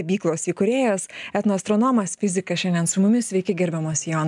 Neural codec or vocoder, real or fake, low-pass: none; real; 10.8 kHz